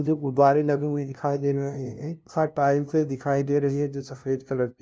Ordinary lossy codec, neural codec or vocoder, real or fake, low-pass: none; codec, 16 kHz, 0.5 kbps, FunCodec, trained on LibriTTS, 25 frames a second; fake; none